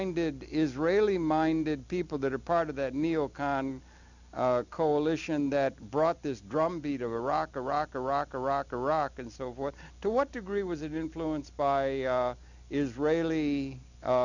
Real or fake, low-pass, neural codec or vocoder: real; 7.2 kHz; none